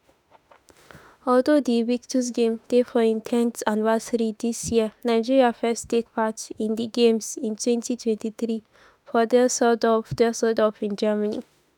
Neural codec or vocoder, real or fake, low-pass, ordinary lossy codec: autoencoder, 48 kHz, 32 numbers a frame, DAC-VAE, trained on Japanese speech; fake; none; none